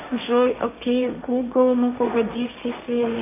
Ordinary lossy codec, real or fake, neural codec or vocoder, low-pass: MP3, 24 kbps; fake; codec, 16 kHz, 1.1 kbps, Voila-Tokenizer; 3.6 kHz